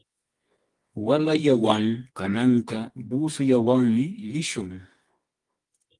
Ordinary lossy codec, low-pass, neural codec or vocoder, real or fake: Opus, 24 kbps; 10.8 kHz; codec, 24 kHz, 0.9 kbps, WavTokenizer, medium music audio release; fake